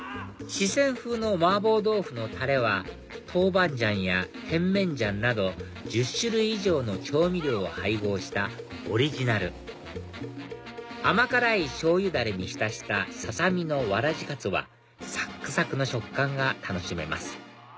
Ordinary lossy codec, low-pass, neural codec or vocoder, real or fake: none; none; none; real